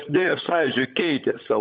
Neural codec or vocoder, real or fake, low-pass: codec, 16 kHz, 16 kbps, FunCodec, trained on LibriTTS, 50 frames a second; fake; 7.2 kHz